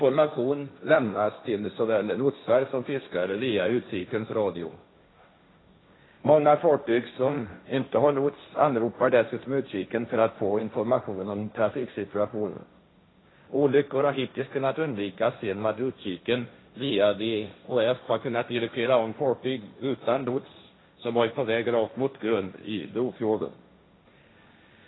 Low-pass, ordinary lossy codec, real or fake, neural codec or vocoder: 7.2 kHz; AAC, 16 kbps; fake; codec, 16 kHz, 1.1 kbps, Voila-Tokenizer